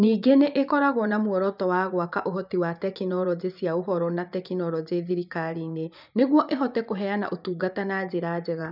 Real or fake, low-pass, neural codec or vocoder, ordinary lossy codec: fake; 5.4 kHz; vocoder, 24 kHz, 100 mel bands, Vocos; none